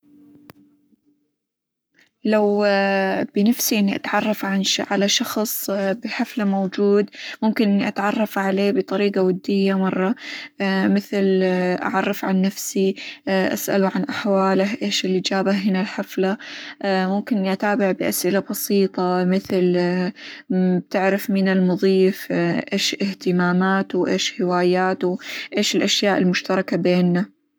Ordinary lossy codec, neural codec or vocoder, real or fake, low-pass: none; codec, 44.1 kHz, 7.8 kbps, Pupu-Codec; fake; none